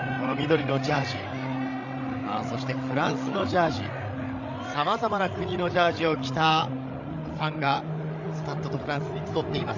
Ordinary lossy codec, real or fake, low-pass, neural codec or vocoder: none; fake; 7.2 kHz; codec, 16 kHz, 8 kbps, FreqCodec, larger model